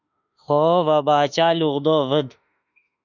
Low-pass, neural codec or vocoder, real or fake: 7.2 kHz; autoencoder, 48 kHz, 32 numbers a frame, DAC-VAE, trained on Japanese speech; fake